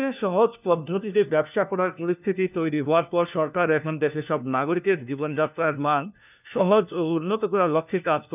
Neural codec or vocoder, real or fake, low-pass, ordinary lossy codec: codec, 16 kHz, 1 kbps, FunCodec, trained on LibriTTS, 50 frames a second; fake; 3.6 kHz; none